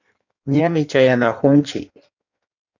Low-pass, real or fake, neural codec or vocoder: 7.2 kHz; fake; codec, 16 kHz in and 24 kHz out, 1.1 kbps, FireRedTTS-2 codec